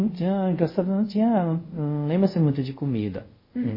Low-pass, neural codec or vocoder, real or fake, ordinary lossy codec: 5.4 kHz; codec, 24 kHz, 0.5 kbps, DualCodec; fake; MP3, 24 kbps